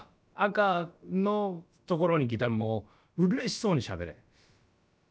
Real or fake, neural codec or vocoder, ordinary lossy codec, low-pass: fake; codec, 16 kHz, about 1 kbps, DyCAST, with the encoder's durations; none; none